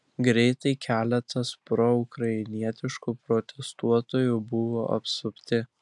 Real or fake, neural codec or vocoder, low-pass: real; none; 10.8 kHz